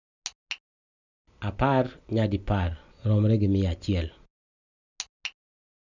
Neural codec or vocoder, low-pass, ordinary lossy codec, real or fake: none; 7.2 kHz; none; real